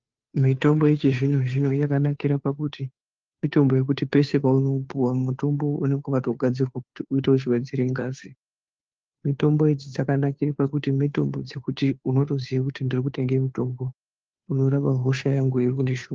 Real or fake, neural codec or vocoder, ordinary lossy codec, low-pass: fake; codec, 16 kHz, 2 kbps, FunCodec, trained on Chinese and English, 25 frames a second; Opus, 24 kbps; 7.2 kHz